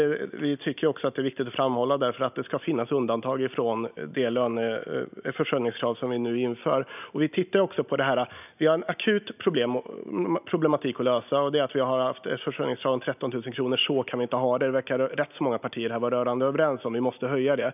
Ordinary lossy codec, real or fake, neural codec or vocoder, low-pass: none; real; none; 3.6 kHz